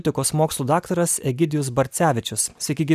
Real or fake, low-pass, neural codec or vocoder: real; 14.4 kHz; none